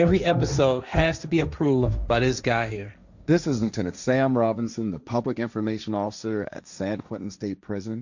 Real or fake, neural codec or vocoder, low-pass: fake; codec, 16 kHz, 1.1 kbps, Voila-Tokenizer; 7.2 kHz